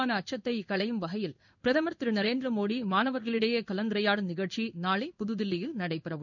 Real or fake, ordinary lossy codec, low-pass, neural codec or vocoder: fake; none; 7.2 kHz; codec, 16 kHz in and 24 kHz out, 1 kbps, XY-Tokenizer